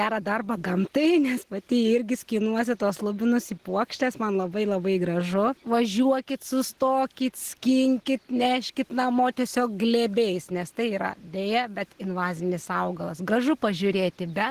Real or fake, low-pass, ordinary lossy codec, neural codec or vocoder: real; 14.4 kHz; Opus, 16 kbps; none